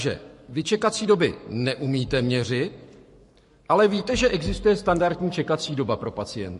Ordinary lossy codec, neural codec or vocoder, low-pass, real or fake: MP3, 48 kbps; codec, 44.1 kHz, 7.8 kbps, Pupu-Codec; 14.4 kHz; fake